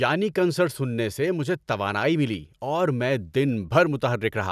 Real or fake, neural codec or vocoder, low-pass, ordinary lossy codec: real; none; 14.4 kHz; none